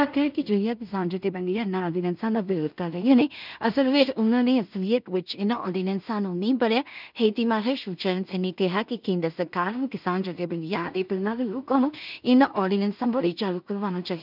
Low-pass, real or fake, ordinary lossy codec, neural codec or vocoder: 5.4 kHz; fake; none; codec, 16 kHz in and 24 kHz out, 0.4 kbps, LongCat-Audio-Codec, two codebook decoder